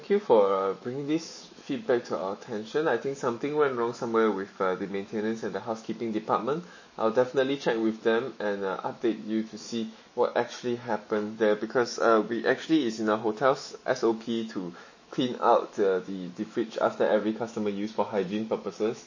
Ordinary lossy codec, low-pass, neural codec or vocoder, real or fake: MP3, 32 kbps; 7.2 kHz; autoencoder, 48 kHz, 128 numbers a frame, DAC-VAE, trained on Japanese speech; fake